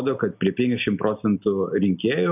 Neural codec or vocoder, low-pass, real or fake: none; 3.6 kHz; real